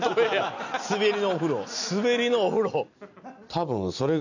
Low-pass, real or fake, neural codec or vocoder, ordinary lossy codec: 7.2 kHz; real; none; none